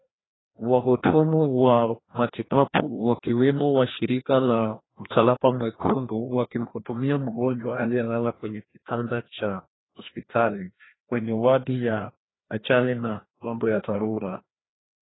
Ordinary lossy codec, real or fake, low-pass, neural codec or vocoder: AAC, 16 kbps; fake; 7.2 kHz; codec, 16 kHz, 1 kbps, FreqCodec, larger model